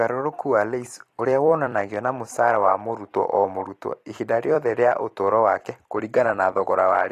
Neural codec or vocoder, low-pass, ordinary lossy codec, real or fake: vocoder, 44.1 kHz, 128 mel bands every 256 samples, BigVGAN v2; 14.4 kHz; AAC, 48 kbps; fake